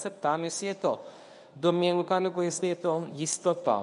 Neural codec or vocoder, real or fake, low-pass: codec, 24 kHz, 0.9 kbps, WavTokenizer, medium speech release version 1; fake; 10.8 kHz